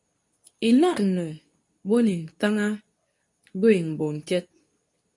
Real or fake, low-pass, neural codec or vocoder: fake; 10.8 kHz; codec, 24 kHz, 0.9 kbps, WavTokenizer, medium speech release version 2